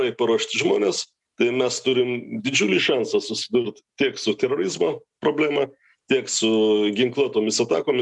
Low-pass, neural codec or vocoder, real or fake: 10.8 kHz; none; real